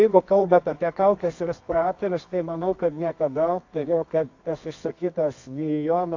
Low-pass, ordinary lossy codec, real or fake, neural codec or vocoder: 7.2 kHz; AAC, 48 kbps; fake; codec, 24 kHz, 0.9 kbps, WavTokenizer, medium music audio release